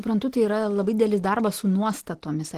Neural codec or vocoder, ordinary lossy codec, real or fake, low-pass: none; Opus, 16 kbps; real; 14.4 kHz